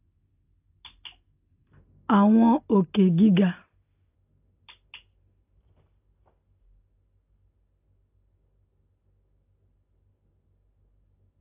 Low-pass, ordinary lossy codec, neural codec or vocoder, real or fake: 3.6 kHz; AAC, 32 kbps; none; real